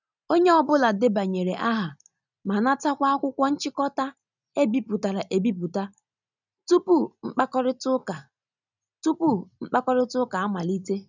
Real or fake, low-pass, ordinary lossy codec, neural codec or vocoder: real; 7.2 kHz; none; none